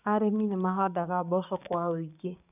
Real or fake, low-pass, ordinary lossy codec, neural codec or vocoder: fake; 3.6 kHz; none; codec, 16 kHz, 6 kbps, DAC